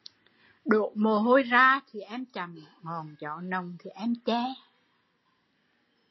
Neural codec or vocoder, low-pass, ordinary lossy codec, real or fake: none; 7.2 kHz; MP3, 24 kbps; real